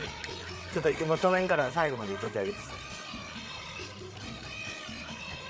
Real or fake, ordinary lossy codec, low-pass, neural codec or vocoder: fake; none; none; codec, 16 kHz, 8 kbps, FreqCodec, larger model